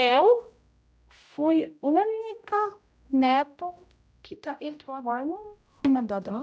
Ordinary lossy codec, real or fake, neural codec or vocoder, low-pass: none; fake; codec, 16 kHz, 0.5 kbps, X-Codec, HuBERT features, trained on general audio; none